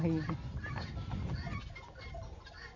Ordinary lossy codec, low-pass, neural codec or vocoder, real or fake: none; 7.2 kHz; none; real